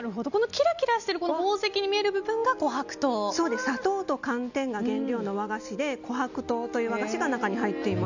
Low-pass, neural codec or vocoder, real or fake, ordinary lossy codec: 7.2 kHz; none; real; none